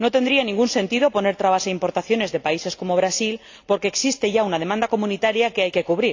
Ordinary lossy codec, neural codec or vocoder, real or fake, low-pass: AAC, 48 kbps; none; real; 7.2 kHz